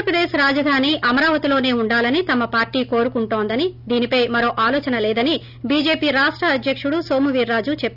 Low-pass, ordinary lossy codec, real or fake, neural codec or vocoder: 5.4 kHz; none; real; none